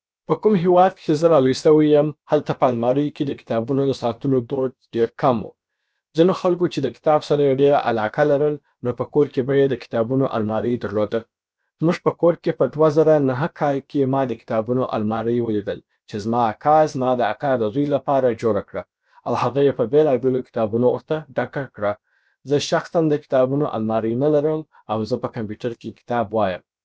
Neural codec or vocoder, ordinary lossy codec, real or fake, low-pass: codec, 16 kHz, about 1 kbps, DyCAST, with the encoder's durations; none; fake; none